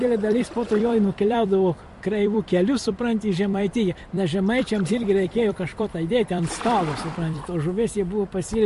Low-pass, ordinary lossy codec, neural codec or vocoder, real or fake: 14.4 kHz; MP3, 48 kbps; vocoder, 44.1 kHz, 128 mel bands every 256 samples, BigVGAN v2; fake